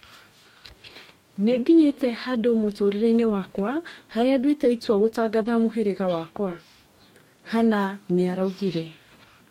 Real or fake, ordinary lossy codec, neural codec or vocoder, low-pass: fake; MP3, 64 kbps; codec, 44.1 kHz, 2.6 kbps, DAC; 19.8 kHz